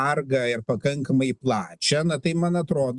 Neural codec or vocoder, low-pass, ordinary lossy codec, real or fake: none; 10.8 kHz; Opus, 64 kbps; real